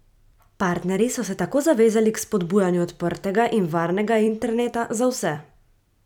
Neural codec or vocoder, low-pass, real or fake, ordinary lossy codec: vocoder, 44.1 kHz, 128 mel bands every 256 samples, BigVGAN v2; 19.8 kHz; fake; none